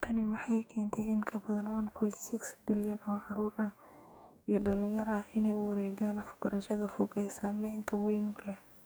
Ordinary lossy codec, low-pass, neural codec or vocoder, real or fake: none; none; codec, 44.1 kHz, 2.6 kbps, DAC; fake